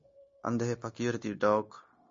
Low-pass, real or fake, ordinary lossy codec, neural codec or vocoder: 7.2 kHz; fake; MP3, 32 kbps; codec, 16 kHz, 0.9 kbps, LongCat-Audio-Codec